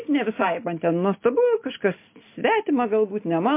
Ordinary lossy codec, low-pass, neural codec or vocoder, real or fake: MP3, 24 kbps; 3.6 kHz; none; real